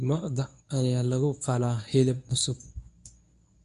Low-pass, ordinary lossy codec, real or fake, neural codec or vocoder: 10.8 kHz; none; fake; codec, 24 kHz, 0.9 kbps, WavTokenizer, medium speech release version 1